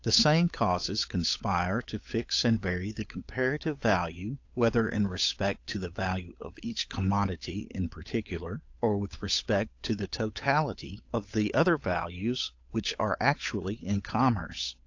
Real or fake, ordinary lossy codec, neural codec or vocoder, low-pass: fake; AAC, 48 kbps; codec, 16 kHz, 8 kbps, FunCodec, trained on Chinese and English, 25 frames a second; 7.2 kHz